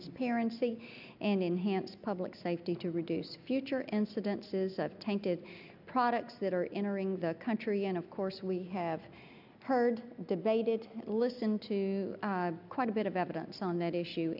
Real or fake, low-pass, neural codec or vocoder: real; 5.4 kHz; none